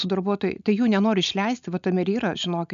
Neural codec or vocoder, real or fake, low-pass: none; real; 7.2 kHz